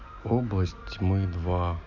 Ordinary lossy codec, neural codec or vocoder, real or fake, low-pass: none; none; real; 7.2 kHz